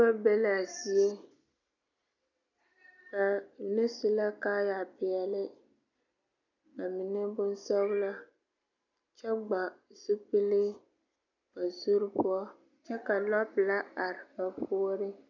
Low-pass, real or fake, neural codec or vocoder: 7.2 kHz; real; none